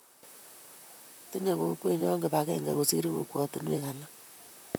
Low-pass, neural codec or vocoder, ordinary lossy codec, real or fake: none; vocoder, 44.1 kHz, 128 mel bands, Pupu-Vocoder; none; fake